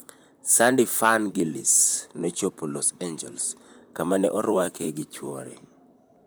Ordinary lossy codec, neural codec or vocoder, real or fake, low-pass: none; vocoder, 44.1 kHz, 128 mel bands, Pupu-Vocoder; fake; none